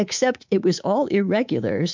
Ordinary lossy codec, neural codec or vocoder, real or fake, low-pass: MP3, 64 kbps; codec, 24 kHz, 3.1 kbps, DualCodec; fake; 7.2 kHz